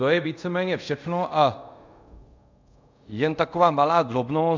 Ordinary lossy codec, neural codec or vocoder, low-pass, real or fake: MP3, 64 kbps; codec, 24 kHz, 0.5 kbps, DualCodec; 7.2 kHz; fake